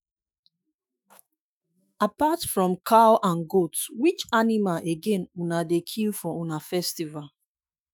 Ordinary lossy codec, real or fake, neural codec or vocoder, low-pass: none; fake; autoencoder, 48 kHz, 128 numbers a frame, DAC-VAE, trained on Japanese speech; none